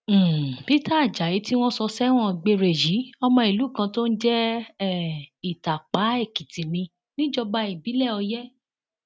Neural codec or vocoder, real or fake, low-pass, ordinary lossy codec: none; real; none; none